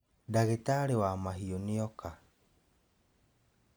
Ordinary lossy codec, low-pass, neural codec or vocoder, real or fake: none; none; none; real